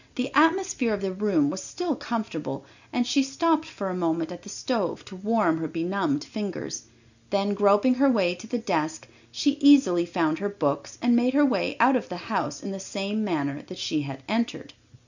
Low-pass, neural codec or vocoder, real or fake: 7.2 kHz; none; real